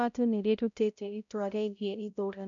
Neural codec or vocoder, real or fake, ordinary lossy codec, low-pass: codec, 16 kHz, 0.5 kbps, X-Codec, HuBERT features, trained on balanced general audio; fake; none; 7.2 kHz